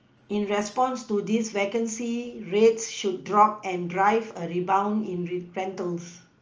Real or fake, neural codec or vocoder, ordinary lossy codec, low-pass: real; none; Opus, 24 kbps; 7.2 kHz